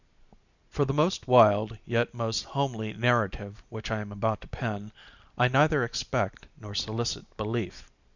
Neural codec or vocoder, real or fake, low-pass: none; real; 7.2 kHz